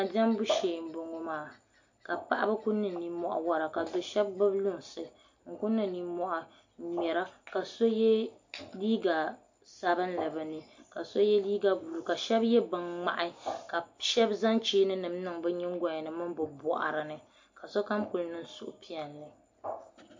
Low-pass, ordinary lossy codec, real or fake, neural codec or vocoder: 7.2 kHz; MP3, 48 kbps; real; none